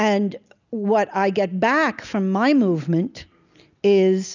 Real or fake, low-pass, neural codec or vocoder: real; 7.2 kHz; none